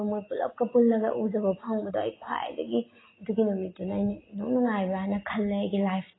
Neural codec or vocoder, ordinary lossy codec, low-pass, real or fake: none; AAC, 16 kbps; 7.2 kHz; real